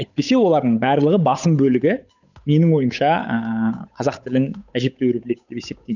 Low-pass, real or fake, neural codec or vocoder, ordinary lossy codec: 7.2 kHz; fake; codec, 16 kHz, 8 kbps, FunCodec, trained on Chinese and English, 25 frames a second; none